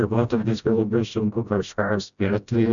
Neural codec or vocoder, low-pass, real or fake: codec, 16 kHz, 0.5 kbps, FreqCodec, smaller model; 7.2 kHz; fake